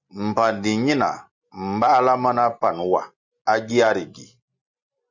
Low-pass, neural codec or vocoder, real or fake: 7.2 kHz; none; real